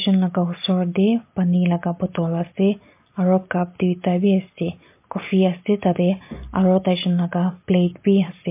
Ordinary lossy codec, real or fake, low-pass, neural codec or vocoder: MP3, 24 kbps; real; 3.6 kHz; none